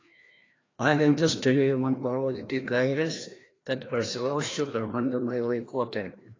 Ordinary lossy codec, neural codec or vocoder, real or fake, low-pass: AAC, 32 kbps; codec, 16 kHz, 1 kbps, FreqCodec, larger model; fake; 7.2 kHz